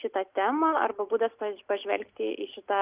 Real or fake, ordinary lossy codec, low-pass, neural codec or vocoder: real; Opus, 32 kbps; 3.6 kHz; none